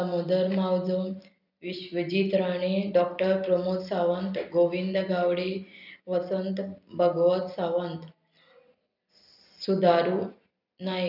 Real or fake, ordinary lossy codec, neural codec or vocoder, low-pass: real; none; none; 5.4 kHz